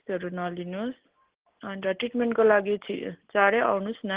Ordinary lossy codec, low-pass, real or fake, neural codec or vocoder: Opus, 16 kbps; 3.6 kHz; real; none